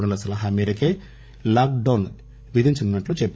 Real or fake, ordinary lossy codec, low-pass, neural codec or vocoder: fake; none; none; codec, 16 kHz, 16 kbps, FreqCodec, larger model